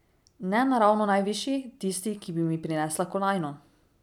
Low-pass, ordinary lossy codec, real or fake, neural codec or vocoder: 19.8 kHz; none; real; none